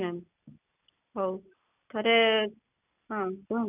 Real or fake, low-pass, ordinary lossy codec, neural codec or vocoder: real; 3.6 kHz; none; none